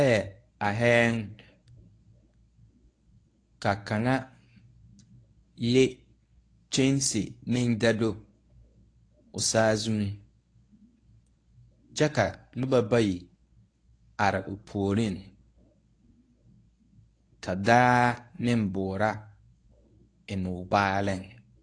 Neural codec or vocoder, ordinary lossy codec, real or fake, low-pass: codec, 24 kHz, 0.9 kbps, WavTokenizer, medium speech release version 1; AAC, 48 kbps; fake; 9.9 kHz